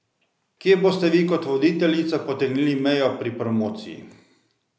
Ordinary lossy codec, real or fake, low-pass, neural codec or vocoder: none; real; none; none